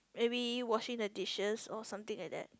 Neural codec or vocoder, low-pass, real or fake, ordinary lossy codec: none; none; real; none